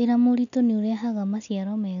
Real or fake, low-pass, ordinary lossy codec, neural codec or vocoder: real; 7.2 kHz; none; none